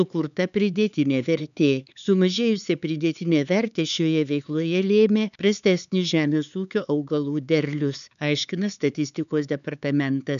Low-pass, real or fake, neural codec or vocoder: 7.2 kHz; fake; codec, 16 kHz, 4 kbps, X-Codec, HuBERT features, trained on LibriSpeech